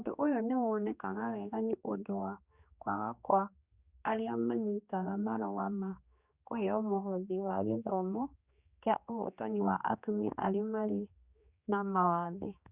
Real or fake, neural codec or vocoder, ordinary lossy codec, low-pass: fake; codec, 16 kHz, 2 kbps, X-Codec, HuBERT features, trained on general audio; Opus, 64 kbps; 3.6 kHz